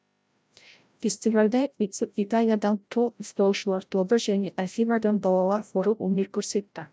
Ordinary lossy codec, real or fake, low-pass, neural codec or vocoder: none; fake; none; codec, 16 kHz, 0.5 kbps, FreqCodec, larger model